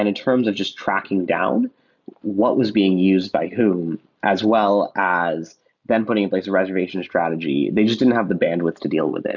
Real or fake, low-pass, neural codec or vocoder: real; 7.2 kHz; none